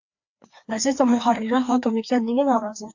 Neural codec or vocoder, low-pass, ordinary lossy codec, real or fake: codec, 16 kHz, 2 kbps, FreqCodec, larger model; 7.2 kHz; AAC, 48 kbps; fake